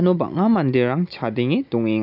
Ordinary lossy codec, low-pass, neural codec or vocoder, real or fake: none; 5.4 kHz; none; real